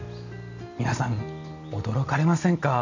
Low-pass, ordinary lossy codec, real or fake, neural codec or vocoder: 7.2 kHz; none; real; none